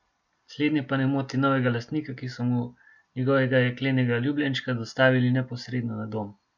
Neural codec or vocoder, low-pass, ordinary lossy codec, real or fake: none; 7.2 kHz; MP3, 64 kbps; real